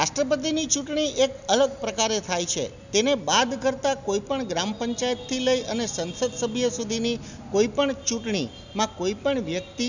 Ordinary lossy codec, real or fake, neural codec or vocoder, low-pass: none; real; none; 7.2 kHz